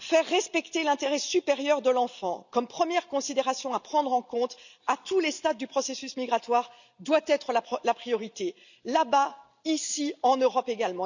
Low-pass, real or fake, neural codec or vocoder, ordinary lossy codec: 7.2 kHz; real; none; none